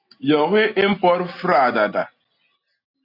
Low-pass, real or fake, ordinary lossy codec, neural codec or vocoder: 5.4 kHz; real; AAC, 48 kbps; none